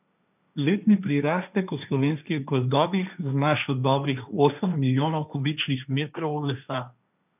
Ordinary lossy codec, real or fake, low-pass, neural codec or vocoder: none; fake; 3.6 kHz; codec, 16 kHz, 1.1 kbps, Voila-Tokenizer